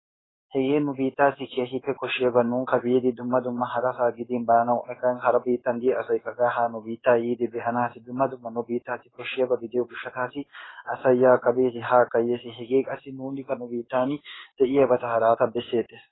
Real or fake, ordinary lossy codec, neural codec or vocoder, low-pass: fake; AAC, 16 kbps; codec, 16 kHz in and 24 kHz out, 1 kbps, XY-Tokenizer; 7.2 kHz